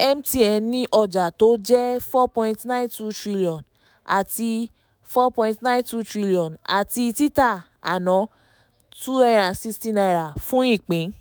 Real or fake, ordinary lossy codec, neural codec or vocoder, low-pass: fake; none; autoencoder, 48 kHz, 128 numbers a frame, DAC-VAE, trained on Japanese speech; none